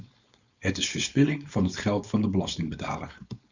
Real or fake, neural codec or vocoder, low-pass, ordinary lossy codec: fake; codec, 16 kHz, 4.8 kbps, FACodec; 7.2 kHz; Opus, 64 kbps